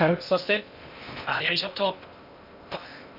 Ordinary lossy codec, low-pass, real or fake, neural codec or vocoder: none; 5.4 kHz; fake; codec, 16 kHz in and 24 kHz out, 0.6 kbps, FocalCodec, streaming, 2048 codes